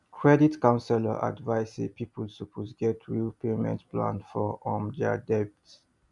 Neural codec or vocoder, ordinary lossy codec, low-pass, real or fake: none; none; 10.8 kHz; real